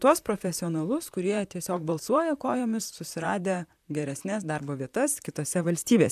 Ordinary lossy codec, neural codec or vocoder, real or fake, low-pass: AAC, 96 kbps; vocoder, 44.1 kHz, 128 mel bands, Pupu-Vocoder; fake; 14.4 kHz